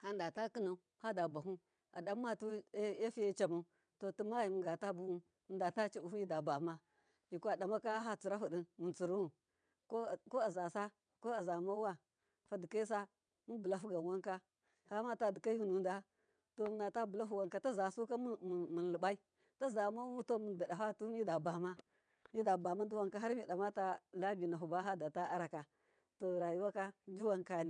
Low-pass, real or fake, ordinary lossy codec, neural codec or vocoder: 9.9 kHz; fake; none; vocoder, 22.05 kHz, 80 mel bands, WaveNeXt